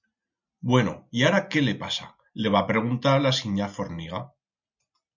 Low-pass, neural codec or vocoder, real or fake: 7.2 kHz; none; real